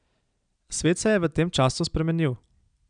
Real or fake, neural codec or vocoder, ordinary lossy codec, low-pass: real; none; none; 9.9 kHz